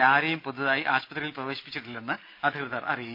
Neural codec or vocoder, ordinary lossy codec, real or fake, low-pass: none; none; real; 5.4 kHz